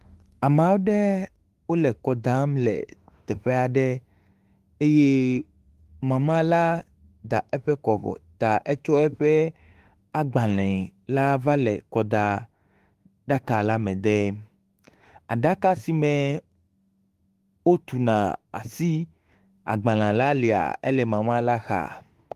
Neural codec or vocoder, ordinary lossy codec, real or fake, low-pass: autoencoder, 48 kHz, 32 numbers a frame, DAC-VAE, trained on Japanese speech; Opus, 24 kbps; fake; 14.4 kHz